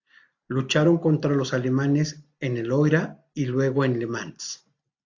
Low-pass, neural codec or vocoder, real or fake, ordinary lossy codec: 7.2 kHz; none; real; AAC, 48 kbps